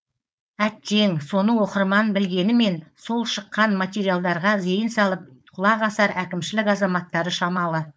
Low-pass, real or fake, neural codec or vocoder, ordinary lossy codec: none; fake; codec, 16 kHz, 4.8 kbps, FACodec; none